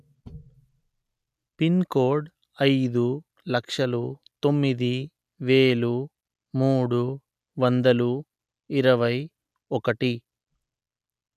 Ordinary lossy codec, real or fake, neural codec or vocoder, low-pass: none; real; none; 14.4 kHz